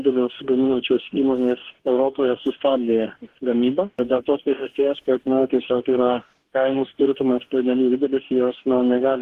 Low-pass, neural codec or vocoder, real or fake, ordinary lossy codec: 14.4 kHz; codec, 44.1 kHz, 2.6 kbps, DAC; fake; Opus, 16 kbps